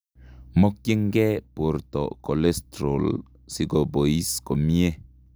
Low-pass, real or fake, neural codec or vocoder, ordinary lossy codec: none; real; none; none